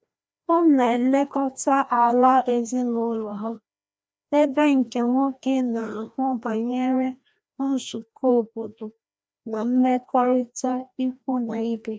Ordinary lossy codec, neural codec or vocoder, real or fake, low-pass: none; codec, 16 kHz, 1 kbps, FreqCodec, larger model; fake; none